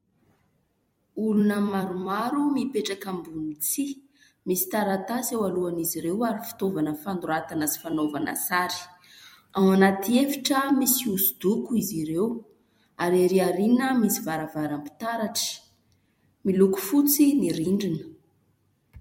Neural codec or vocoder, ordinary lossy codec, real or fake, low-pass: vocoder, 44.1 kHz, 128 mel bands every 256 samples, BigVGAN v2; MP3, 64 kbps; fake; 19.8 kHz